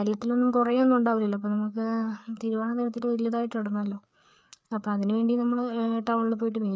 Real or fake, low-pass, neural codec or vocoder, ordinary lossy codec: fake; none; codec, 16 kHz, 4 kbps, FreqCodec, larger model; none